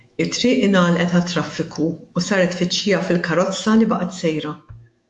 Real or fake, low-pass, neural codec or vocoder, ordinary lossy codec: fake; 10.8 kHz; autoencoder, 48 kHz, 128 numbers a frame, DAC-VAE, trained on Japanese speech; AAC, 64 kbps